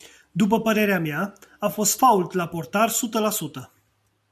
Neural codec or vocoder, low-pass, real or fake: none; 14.4 kHz; real